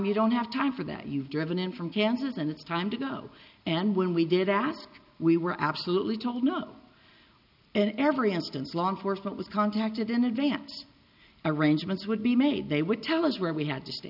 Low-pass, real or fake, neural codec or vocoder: 5.4 kHz; real; none